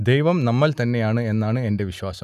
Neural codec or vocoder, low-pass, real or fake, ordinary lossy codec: none; 14.4 kHz; real; none